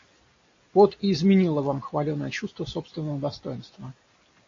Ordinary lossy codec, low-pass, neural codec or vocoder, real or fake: AAC, 32 kbps; 7.2 kHz; none; real